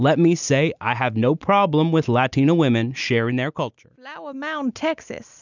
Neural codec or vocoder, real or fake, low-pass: none; real; 7.2 kHz